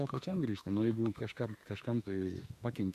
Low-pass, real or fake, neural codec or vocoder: 14.4 kHz; fake; autoencoder, 48 kHz, 32 numbers a frame, DAC-VAE, trained on Japanese speech